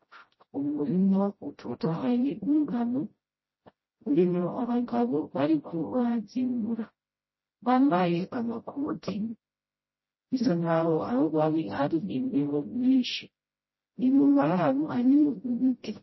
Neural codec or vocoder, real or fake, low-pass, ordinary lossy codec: codec, 16 kHz, 0.5 kbps, FreqCodec, smaller model; fake; 7.2 kHz; MP3, 24 kbps